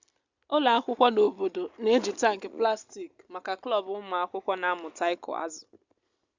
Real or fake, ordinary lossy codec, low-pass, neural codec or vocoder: real; Opus, 64 kbps; 7.2 kHz; none